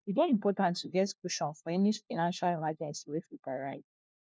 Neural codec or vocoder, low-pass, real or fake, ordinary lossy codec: codec, 16 kHz, 1 kbps, FunCodec, trained on LibriTTS, 50 frames a second; none; fake; none